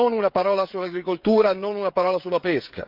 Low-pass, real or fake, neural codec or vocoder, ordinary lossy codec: 5.4 kHz; fake; codec, 16 kHz, 16 kbps, FreqCodec, smaller model; Opus, 32 kbps